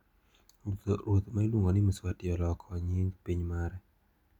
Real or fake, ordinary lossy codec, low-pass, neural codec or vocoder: real; none; 19.8 kHz; none